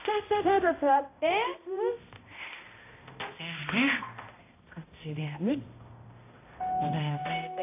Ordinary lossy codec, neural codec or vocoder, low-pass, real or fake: AAC, 24 kbps; codec, 16 kHz, 0.5 kbps, X-Codec, HuBERT features, trained on balanced general audio; 3.6 kHz; fake